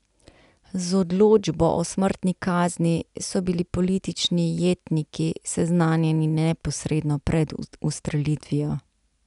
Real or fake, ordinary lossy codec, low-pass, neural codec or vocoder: real; none; 10.8 kHz; none